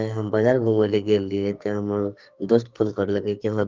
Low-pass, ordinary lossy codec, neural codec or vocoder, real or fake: 7.2 kHz; Opus, 24 kbps; codec, 44.1 kHz, 2.6 kbps, SNAC; fake